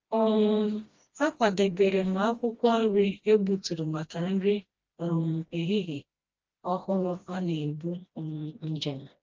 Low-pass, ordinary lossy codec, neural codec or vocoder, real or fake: 7.2 kHz; Opus, 32 kbps; codec, 16 kHz, 1 kbps, FreqCodec, smaller model; fake